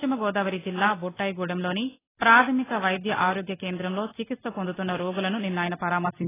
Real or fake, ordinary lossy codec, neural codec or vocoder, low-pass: real; AAC, 16 kbps; none; 3.6 kHz